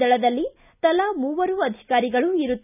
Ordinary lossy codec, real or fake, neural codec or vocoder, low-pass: none; real; none; 3.6 kHz